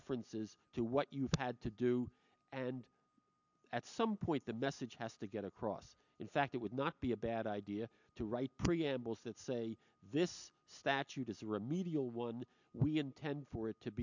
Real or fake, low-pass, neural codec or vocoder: real; 7.2 kHz; none